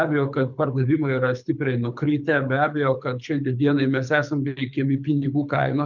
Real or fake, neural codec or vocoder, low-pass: fake; codec, 24 kHz, 6 kbps, HILCodec; 7.2 kHz